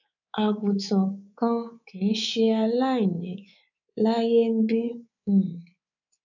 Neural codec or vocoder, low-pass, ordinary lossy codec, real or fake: codec, 24 kHz, 3.1 kbps, DualCodec; 7.2 kHz; none; fake